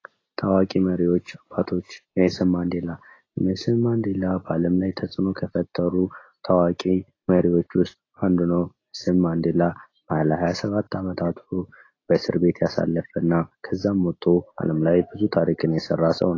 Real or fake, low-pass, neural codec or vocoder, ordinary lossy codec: real; 7.2 kHz; none; AAC, 32 kbps